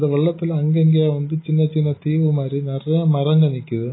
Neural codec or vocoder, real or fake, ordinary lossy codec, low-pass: none; real; AAC, 16 kbps; 7.2 kHz